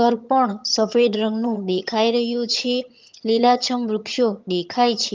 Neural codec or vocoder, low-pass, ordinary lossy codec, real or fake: vocoder, 22.05 kHz, 80 mel bands, HiFi-GAN; 7.2 kHz; Opus, 32 kbps; fake